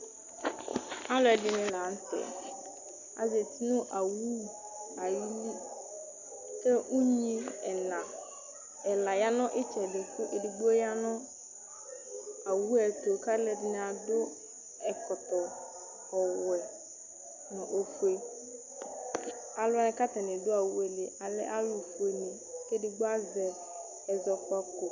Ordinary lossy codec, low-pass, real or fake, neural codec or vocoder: Opus, 64 kbps; 7.2 kHz; real; none